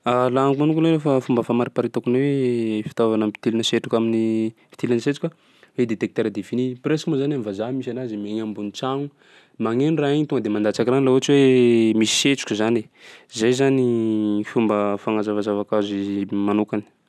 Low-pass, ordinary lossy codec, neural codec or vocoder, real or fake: none; none; none; real